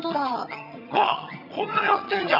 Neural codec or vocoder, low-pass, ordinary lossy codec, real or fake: vocoder, 22.05 kHz, 80 mel bands, HiFi-GAN; 5.4 kHz; none; fake